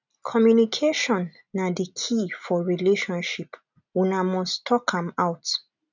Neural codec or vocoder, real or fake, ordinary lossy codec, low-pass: none; real; none; 7.2 kHz